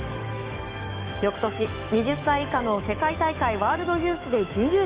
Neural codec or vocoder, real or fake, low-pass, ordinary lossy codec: autoencoder, 48 kHz, 128 numbers a frame, DAC-VAE, trained on Japanese speech; fake; 3.6 kHz; Opus, 32 kbps